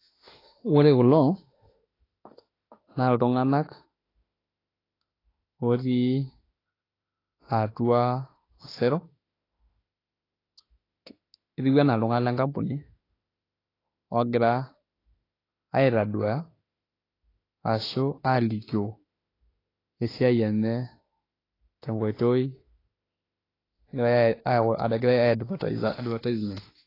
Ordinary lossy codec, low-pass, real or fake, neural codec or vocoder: AAC, 24 kbps; 5.4 kHz; fake; autoencoder, 48 kHz, 32 numbers a frame, DAC-VAE, trained on Japanese speech